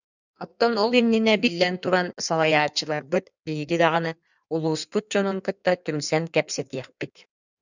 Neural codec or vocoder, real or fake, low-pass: codec, 16 kHz in and 24 kHz out, 1.1 kbps, FireRedTTS-2 codec; fake; 7.2 kHz